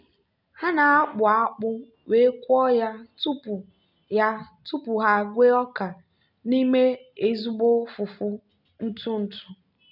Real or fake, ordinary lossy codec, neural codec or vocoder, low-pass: real; none; none; 5.4 kHz